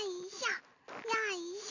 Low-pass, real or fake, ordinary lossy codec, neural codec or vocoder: 7.2 kHz; real; none; none